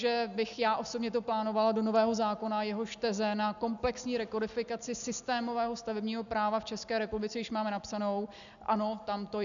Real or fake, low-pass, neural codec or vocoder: real; 7.2 kHz; none